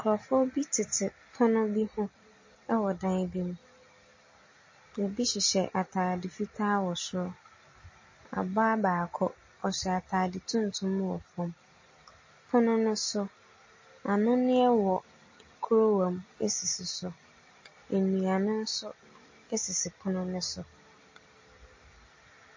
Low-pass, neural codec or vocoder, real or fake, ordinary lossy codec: 7.2 kHz; none; real; MP3, 32 kbps